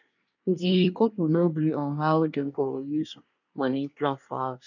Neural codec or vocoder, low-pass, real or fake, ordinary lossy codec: codec, 24 kHz, 1 kbps, SNAC; 7.2 kHz; fake; none